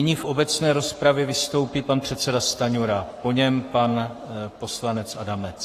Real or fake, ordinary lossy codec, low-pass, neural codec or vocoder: fake; AAC, 48 kbps; 14.4 kHz; codec, 44.1 kHz, 7.8 kbps, Pupu-Codec